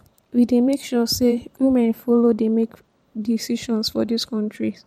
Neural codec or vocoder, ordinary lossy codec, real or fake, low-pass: vocoder, 44.1 kHz, 128 mel bands every 512 samples, BigVGAN v2; MP3, 64 kbps; fake; 19.8 kHz